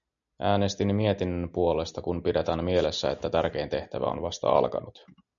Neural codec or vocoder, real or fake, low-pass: none; real; 7.2 kHz